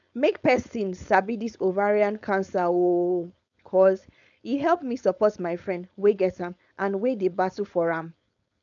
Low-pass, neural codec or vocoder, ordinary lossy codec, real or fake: 7.2 kHz; codec, 16 kHz, 4.8 kbps, FACodec; none; fake